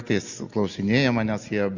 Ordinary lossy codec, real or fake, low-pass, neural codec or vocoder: Opus, 64 kbps; real; 7.2 kHz; none